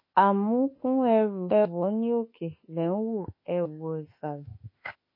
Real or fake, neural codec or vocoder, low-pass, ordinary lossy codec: fake; autoencoder, 48 kHz, 32 numbers a frame, DAC-VAE, trained on Japanese speech; 5.4 kHz; MP3, 24 kbps